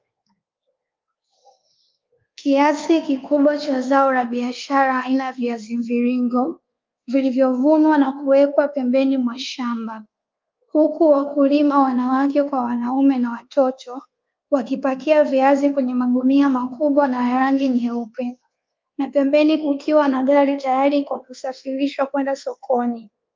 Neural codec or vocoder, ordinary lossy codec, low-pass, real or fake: codec, 24 kHz, 1.2 kbps, DualCodec; Opus, 24 kbps; 7.2 kHz; fake